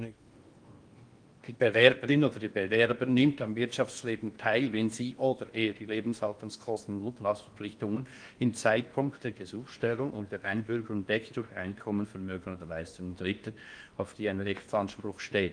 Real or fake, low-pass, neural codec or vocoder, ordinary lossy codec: fake; 9.9 kHz; codec, 16 kHz in and 24 kHz out, 0.8 kbps, FocalCodec, streaming, 65536 codes; Opus, 32 kbps